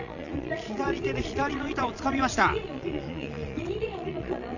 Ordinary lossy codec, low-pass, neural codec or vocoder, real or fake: none; 7.2 kHz; vocoder, 22.05 kHz, 80 mel bands, Vocos; fake